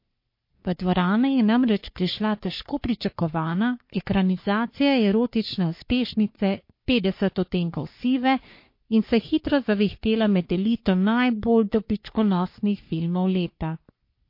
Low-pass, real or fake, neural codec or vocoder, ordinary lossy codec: 5.4 kHz; fake; codec, 44.1 kHz, 3.4 kbps, Pupu-Codec; MP3, 32 kbps